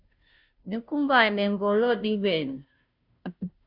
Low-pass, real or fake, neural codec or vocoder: 5.4 kHz; fake; codec, 16 kHz, 0.5 kbps, FunCodec, trained on Chinese and English, 25 frames a second